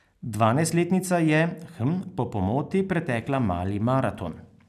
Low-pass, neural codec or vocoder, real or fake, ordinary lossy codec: 14.4 kHz; none; real; none